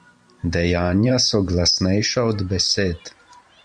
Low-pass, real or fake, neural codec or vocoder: 9.9 kHz; fake; vocoder, 44.1 kHz, 128 mel bands every 512 samples, BigVGAN v2